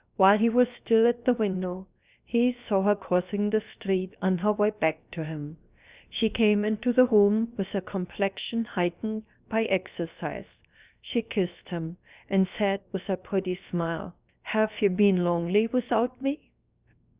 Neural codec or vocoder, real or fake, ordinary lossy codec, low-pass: codec, 16 kHz, 0.7 kbps, FocalCodec; fake; Opus, 64 kbps; 3.6 kHz